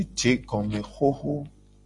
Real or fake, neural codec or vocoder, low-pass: real; none; 10.8 kHz